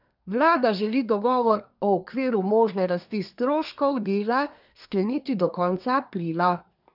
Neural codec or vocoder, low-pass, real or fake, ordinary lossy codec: codec, 24 kHz, 1 kbps, SNAC; 5.4 kHz; fake; none